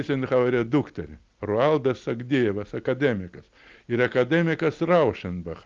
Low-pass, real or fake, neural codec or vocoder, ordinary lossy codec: 7.2 kHz; real; none; Opus, 32 kbps